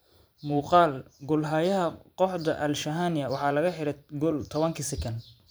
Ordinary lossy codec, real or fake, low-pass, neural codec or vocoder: none; fake; none; vocoder, 44.1 kHz, 128 mel bands every 512 samples, BigVGAN v2